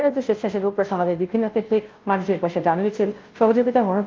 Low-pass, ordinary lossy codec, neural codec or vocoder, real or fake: 7.2 kHz; Opus, 32 kbps; codec, 16 kHz, 0.5 kbps, FunCodec, trained on Chinese and English, 25 frames a second; fake